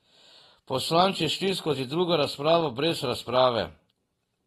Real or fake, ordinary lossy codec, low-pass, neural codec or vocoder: real; AAC, 32 kbps; 19.8 kHz; none